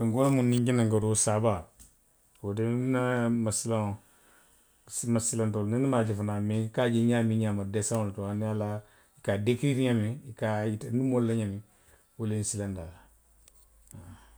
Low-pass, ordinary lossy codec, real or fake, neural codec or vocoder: none; none; real; none